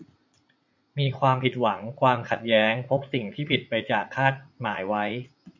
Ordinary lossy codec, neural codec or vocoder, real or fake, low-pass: MP3, 64 kbps; vocoder, 44.1 kHz, 80 mel bands, Vocos; fake; 7.2 kHz